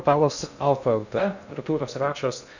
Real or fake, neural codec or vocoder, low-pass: fake; codec, 16 kHz in and 24 kHz out, 0.6 kbps, FocalCodec, streaming, 2048 codes; 7.2 kHz